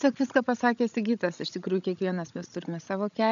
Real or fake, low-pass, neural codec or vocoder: fake; 7.2 kHz; codec, 16 kHz, 16 kbps, FreqCodec, larger model